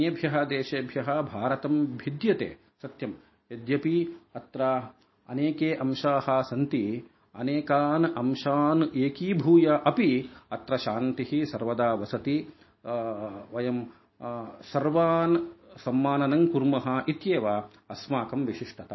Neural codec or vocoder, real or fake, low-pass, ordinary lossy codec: none; real; 7.2 kHz; MP3, 24 kbps